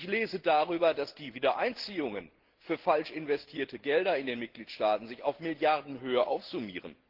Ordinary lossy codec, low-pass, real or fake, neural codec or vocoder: Opus, 16 kbps; 5.4 kHz; real; none